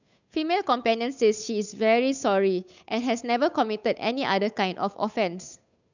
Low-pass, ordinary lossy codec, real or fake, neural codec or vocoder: 7.2 kHz; none; fake; codec, 16 kHz, 8 kbps, FunCodec, trained on Chinese and English, 25 frames a second